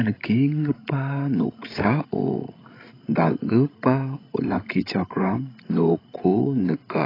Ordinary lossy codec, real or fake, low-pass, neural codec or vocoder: AAC, 24 kbps; fake; 5.4 kHz; codec, 16 kHz, 16 kbps, FreqCodec, larger model